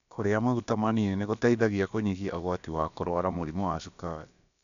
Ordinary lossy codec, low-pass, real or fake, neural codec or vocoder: none; 7.2 kHz; fake; codec, 16 kHz, about 1 kbps, DyCAST, with the encoder's durations